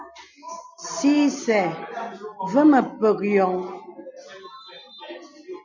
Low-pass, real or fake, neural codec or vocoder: 7.2 kHz; real; none